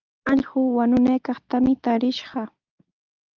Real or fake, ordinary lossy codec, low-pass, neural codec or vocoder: real; Opus, 24 kbps; 7.2 kHz; none